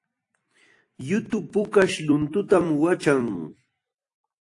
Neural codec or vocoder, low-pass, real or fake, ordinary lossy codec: vocoder, 44.1 kHz, 128 mel bands every 256 samples, BigVGAN v2; 10.8 kHz; fake; AAC, 48 kbps